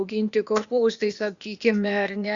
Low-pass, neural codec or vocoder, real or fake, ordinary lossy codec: 7.2 kHz; codec, 16 kHz, 0.8 kbps, ZipCodec; fake; Opus, 64 kbps